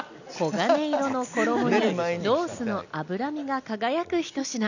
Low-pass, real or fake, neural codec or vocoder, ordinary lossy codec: 7.2 kHz; real; none; none